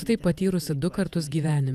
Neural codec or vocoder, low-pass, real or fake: vocoder, 48 kHz, 128 mel bands, Vocos; 14.4 kHz; fake